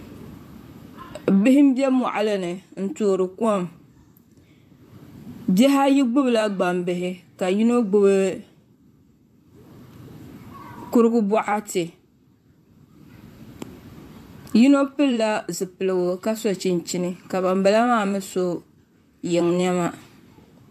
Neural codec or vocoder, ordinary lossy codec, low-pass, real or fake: vocoder, 44.1 kHz, 128 mel bands, Pupu-Vocoder; AAC, 96 kbps; 14.4 kHz; fake